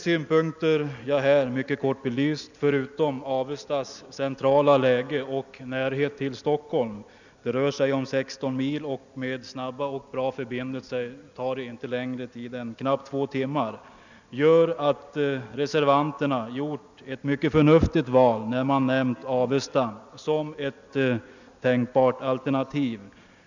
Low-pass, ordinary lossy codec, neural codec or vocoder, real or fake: 7.2 kHz; none; none; real